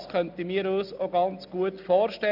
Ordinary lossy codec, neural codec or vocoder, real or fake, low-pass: none; none; real; 5.4 kHz